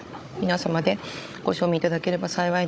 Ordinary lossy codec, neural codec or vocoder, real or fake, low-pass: none; codec, 16 kHz, 16 kbps, FunCodec, trained on Chinese and English, 50 frames a second; fake; none